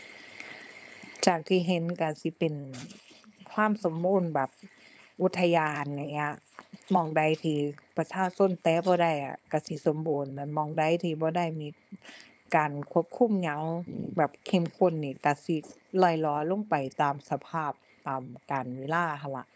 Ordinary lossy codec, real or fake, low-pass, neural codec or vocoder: none; fake; none; codec, 16 kHz, 4.8 kbps, FACodec